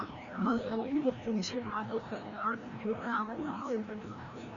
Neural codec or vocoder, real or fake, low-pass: codec, 16 kHz, 1 kbps, FreqCodec, larger model; fake; 7.2 kHz